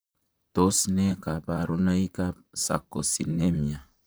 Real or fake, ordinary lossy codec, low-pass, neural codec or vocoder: fake; none; none; vocoder, 44.1 kHz, 128 mel bands, Pupu-Vocoder